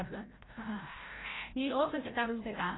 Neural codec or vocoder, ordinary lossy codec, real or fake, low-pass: codec, 16 kHz, 0.5 kbps, FreqCodec, larger model; AAC, 16 kbps; fake; 7.2 kHz